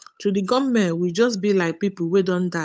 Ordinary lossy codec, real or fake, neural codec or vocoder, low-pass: none; fake; codec, 16 kHz, 8 kbps, FunCodec, trained on Chinese and English, 25 frames a second; none